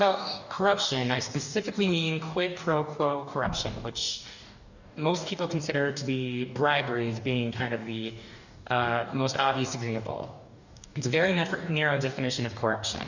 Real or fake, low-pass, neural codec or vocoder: fake; 7.2 kHz; codec, 44.1 kHz, 2.6 kbps, DAC